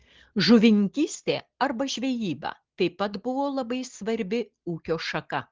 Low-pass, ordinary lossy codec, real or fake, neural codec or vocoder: 7.2 kHz; Opus, 16 kbps; real; none